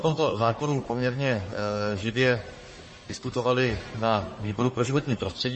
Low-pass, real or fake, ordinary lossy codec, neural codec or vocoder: 10.8 kHz; fake; MP3, 32 kbps; codec, 44.1 kHz, 1.7 kbps, Pupu-Codec